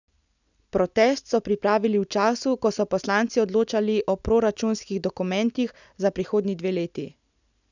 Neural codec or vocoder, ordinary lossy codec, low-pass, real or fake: none; none; 7.2 kHz; real